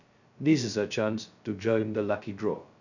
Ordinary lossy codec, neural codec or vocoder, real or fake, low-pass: none; codec, 16 kHz, 0.2 kbps, FocalCodec; fake; 7.2 kHz